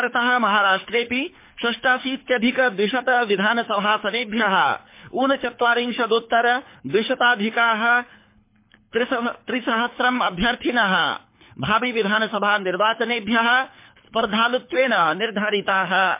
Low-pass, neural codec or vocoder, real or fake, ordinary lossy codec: 3.6 kHz; codec, 24 kHz, 6 kbps, HILCodec; fake; MP3, 24 kbps